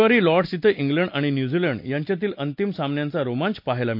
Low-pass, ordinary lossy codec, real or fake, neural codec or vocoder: 5.4 kHz; MP3, 48 kbps; real; none